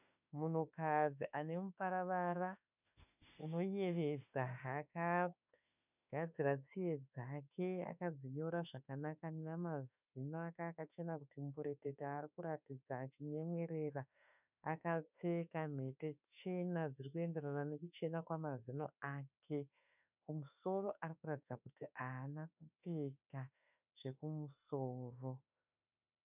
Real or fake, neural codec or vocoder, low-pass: fake; autoencoder, 48 kHz, 32 numbers a frame, DAC-VAE, trained on Japanese speech; 3.6 kHz